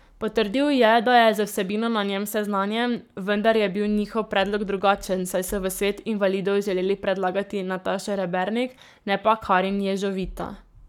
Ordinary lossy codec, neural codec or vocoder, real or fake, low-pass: none; codec, 44.1 kHz, 7.8 kbps, Pupu-Codec; fake; 19.8 kHz